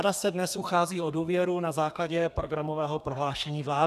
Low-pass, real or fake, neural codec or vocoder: 14.4 kHz; fake; codec, 32 kHz, 1.9 kbps, SNAC